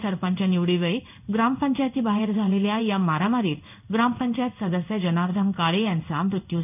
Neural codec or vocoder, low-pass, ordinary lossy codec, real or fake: codec, 16 kHz in and 24 kHz out, 1 kbps, XY-Tokenizer; 3.6 kHz; none; fake